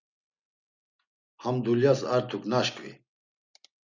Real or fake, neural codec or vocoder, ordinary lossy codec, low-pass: real; none; Opus, 64 kbps; 7.2 kHz